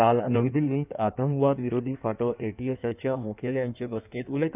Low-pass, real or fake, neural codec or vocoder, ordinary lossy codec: 3.6 kHz; fake; codec, 16 kHz in and 24 kHz out, 1.1 kbps, FireRedTTS-2 codec; none